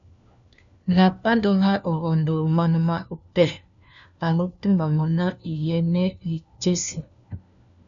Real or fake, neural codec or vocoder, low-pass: fake; codec, 16 kHz, 1 kbps, FunCodec, trained on LibriTTS, 50 frames a second; 7.2 kHz